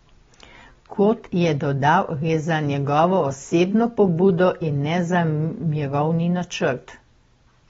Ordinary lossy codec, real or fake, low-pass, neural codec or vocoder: AAC, 24 kbps; real; 7.2 kHz; none